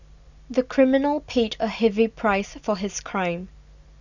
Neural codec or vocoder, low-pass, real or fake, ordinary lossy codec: none; 7.2 kHz; real; none